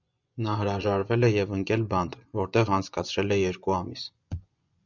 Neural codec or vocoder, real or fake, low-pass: vocoder, 24 kHz, 100 mel bands, Vocos; fake; 7.2 kHz